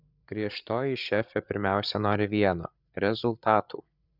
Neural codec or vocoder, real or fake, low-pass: codec, 16 kHz, 8 kbps, FreqCodec, larger model; fake; 5.4 kHz